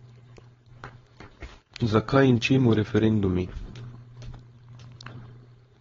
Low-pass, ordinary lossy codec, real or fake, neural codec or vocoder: 7.2 kHz; AAC, 24 kbps; fake; codec, 16 kHz, 4.8 kbps, FACodec